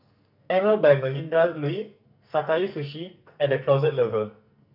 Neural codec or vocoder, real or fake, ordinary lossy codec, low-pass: codec, 44.1 kHz, 2.6 kbps, SNAC; fake; none; 5.4 kHz